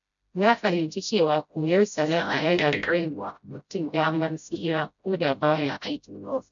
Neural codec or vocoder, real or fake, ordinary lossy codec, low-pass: codec, 16 kHz, 0.5 kbps, FreqCodec, smaller model; fake; none; 7.2 kHz